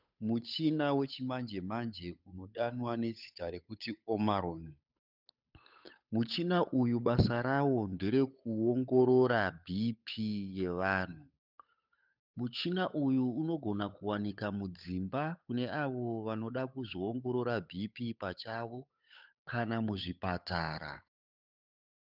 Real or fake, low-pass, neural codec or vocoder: fake; 5.4 kHz; codec, 16 kHz, 8 kbps, FunCodec, trained on Chinese and English, 25 frames a second